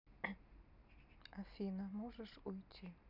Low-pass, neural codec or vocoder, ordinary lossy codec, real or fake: 5.4 kHz; none; none; real